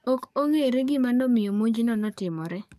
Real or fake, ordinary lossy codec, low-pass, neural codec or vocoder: fake; none; 14.4 kHz; codec, 44.1 kHz, 7.8 kbps, DAC